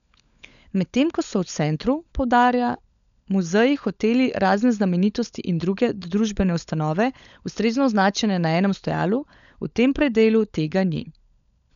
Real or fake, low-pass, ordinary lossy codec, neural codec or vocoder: fake; 7.2 kHz; none; codec, 16 kHz, 16 kbps, FunCodec, trained on LibriTTS, 50 frames a second